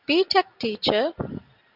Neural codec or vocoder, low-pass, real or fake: none; 5.4 kHz; real